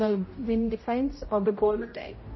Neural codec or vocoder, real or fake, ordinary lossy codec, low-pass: codec, 16 kHz, 0.5 kbps, X-Codec, HuBERT features, trained on general audio; fake; MP3, 24 kbps; 7.2 kHz